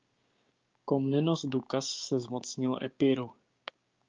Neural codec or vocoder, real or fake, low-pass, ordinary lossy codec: codec, 16 kHz, 6 kbps, DAC; fake; 7.2 kHz; Opus, 32 kbps